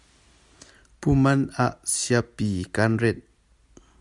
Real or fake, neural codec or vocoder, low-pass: real; none; 10.8 kHz